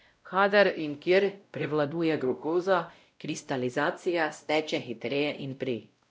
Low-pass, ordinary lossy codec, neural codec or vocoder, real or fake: none; none; codec, 16 kHz, 0.5 kbps, X-Codec, WavLM features, trained on Multilingual LibriSpeech; fake